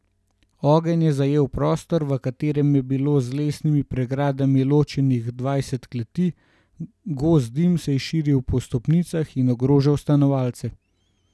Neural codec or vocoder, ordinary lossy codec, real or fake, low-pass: none; none; real; none